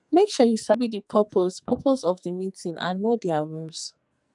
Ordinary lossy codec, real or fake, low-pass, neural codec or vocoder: none; fake; 10.8 kHz; codec, 44.1 kHz, 2.6 kbps, SNAC